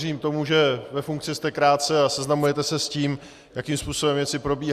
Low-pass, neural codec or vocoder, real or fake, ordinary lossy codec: 14.4 kHz; none; real; Opus, 64 kbps